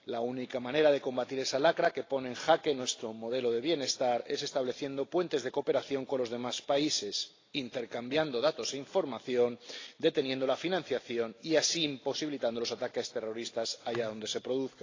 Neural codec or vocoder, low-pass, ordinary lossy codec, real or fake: none; 7.2 kHz; AAC, 32 kbps; real